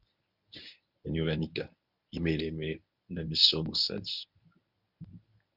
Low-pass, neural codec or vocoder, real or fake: 5.4 kHz; codec, 24 kHz, 0.9 kbps, WavTokenizer, medium speech release version 1; fake